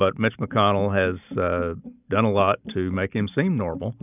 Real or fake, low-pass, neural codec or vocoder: real; 3.6 kHz; none